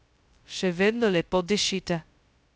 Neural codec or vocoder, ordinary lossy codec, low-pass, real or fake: codec, 16 kHz, 0.2 kbps, FocalCodec; none; none; fake